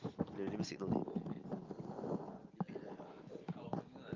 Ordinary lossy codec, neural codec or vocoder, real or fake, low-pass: Opus, 32 kbps; none; real; 7.2 kHz